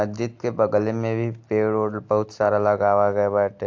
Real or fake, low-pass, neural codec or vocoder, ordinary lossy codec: real; 7.2 kHz; none; AAC, 48 kbps